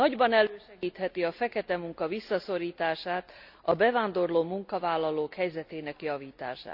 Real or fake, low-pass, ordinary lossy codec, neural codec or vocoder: real; 5.4 kHz; none; none